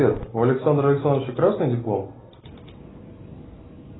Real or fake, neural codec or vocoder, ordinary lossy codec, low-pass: real; none; AAC, 16 kbps; 7.2 kHz